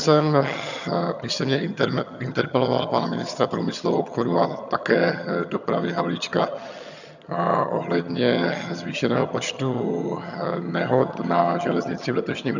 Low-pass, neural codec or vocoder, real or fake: 7.2 kHz; vocoder, 22.05 kHz, 80 mel bands, HiFi-GAN; fake